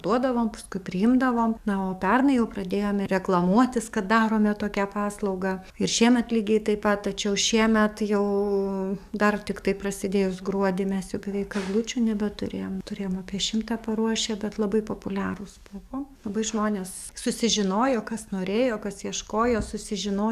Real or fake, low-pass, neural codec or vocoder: fake; 14.4 kHz; codec, 44.1 kHz, 7.8 kbps, DAC